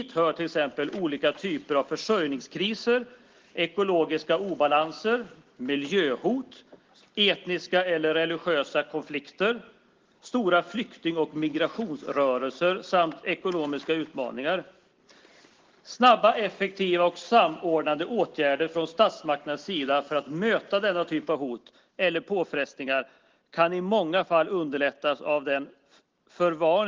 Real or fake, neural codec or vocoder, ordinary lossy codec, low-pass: real; none; Opus, 16 kbps; 7.2 kHz